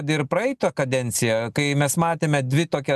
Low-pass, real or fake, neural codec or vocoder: 14.4 kHz; real; none